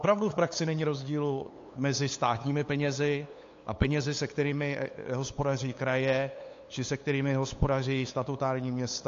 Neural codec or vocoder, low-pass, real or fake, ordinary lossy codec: codec, 16 kHz, 8 kbps, FunCodec, trained on LibriTTS, 25 frames a second; 7.2 kHz; fake; AAC, 48 kbps